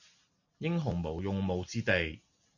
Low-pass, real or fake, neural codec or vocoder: 7.2 kHz; real; none